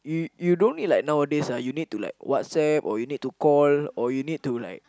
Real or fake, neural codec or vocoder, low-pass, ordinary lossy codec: real; none; none; none